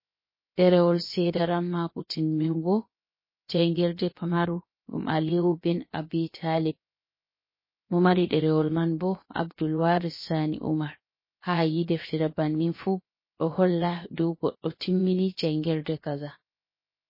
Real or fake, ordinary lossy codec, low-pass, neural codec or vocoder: fake; MP3, 24 kbps; 5.4 kHz; codec, 16 kHz, 0.7 kbps, FocalCodec